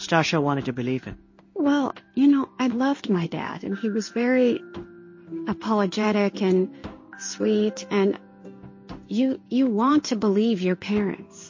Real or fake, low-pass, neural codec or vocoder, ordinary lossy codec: fake; 7.2 kHz; codec, 16 kHz in and 24 kHz out, 1 kbps, XY-Tokenizer; MP3, 32 kbps